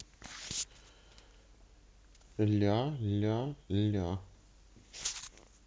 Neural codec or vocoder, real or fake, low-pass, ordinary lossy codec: none; real; none; none